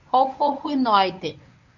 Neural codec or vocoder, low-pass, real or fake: codec, 24 kHz, 0.9 kbps, WavTokenizer, medium speech release version 1; 7.2 kHz; fake